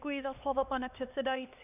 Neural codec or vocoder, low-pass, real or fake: codec, 16 kHz, 2 kbps, X-Codec, HuBERT features, trained on LibriSpeech; 3.6 kHz; fake